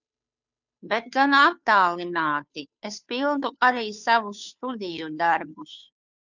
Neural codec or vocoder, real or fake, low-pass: codec, 16 kHz, 2 kbps, FunCodec, trained on Chinese and English, 25 frames a second; fake; 7.2 kHz